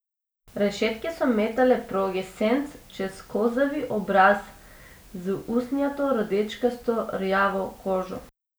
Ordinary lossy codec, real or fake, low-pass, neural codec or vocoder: none; real; none; none